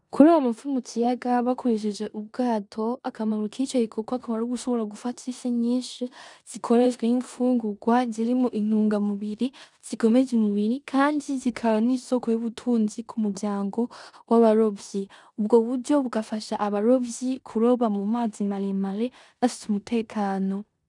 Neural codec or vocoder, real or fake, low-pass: codec, 16 kHz in and 24 kHz out, 0.9 kbps, LongCat-Audio-Codec, four codebook decoder; fake; 10.8 kHz